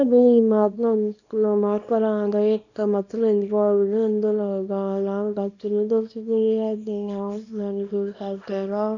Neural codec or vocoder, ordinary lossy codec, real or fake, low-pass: codec, 24 kHz, 0.9 kbps, WavTokenizer, small release; none; fake; 7.2 kHz